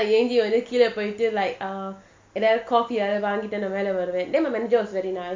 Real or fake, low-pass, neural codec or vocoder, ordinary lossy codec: real; 7.2 kHz; none; MP3, 48 kbps